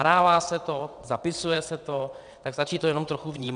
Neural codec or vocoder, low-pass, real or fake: vocoder, 22.05 kHz, 80 mel bands, WaveNeXt; 9.9 kHz; fake